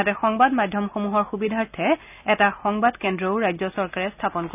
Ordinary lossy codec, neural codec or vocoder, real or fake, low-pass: none; none; real; 3.6 kHz